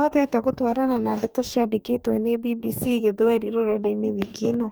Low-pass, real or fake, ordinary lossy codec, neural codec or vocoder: none; fake; none; codec, 44.1 kHz, 2.6 kbps, DAC